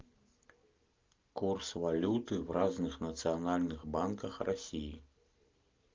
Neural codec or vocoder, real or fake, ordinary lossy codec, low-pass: none; real; Opus, 24 kbps; 7.2 kHz